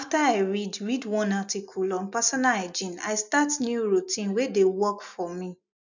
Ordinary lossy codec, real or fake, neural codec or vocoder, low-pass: none; real; none; 7.2 kHz